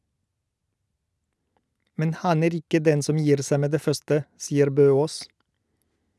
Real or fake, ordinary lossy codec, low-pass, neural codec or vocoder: real; none; none; none